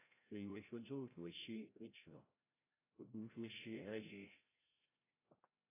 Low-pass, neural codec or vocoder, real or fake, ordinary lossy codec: 3.6 kHz; codec, 16 kHz, 0.5 kbps, FreqCodec, larger model; fake; AAC, 16 kbps